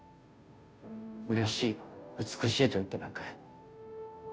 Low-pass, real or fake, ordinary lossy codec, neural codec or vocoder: none; fake; none; codec, 16 kHz, 0.5 kbps, FunCodec, trained on Chinese and English, 25 frames a second